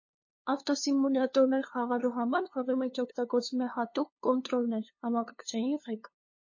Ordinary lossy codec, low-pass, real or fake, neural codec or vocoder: MP3, 32 kbps; 7.2 kHz; fake; codec, 16 kHz, 2 kbps, FunCodec, trained on LibriTTS, 25 frames a second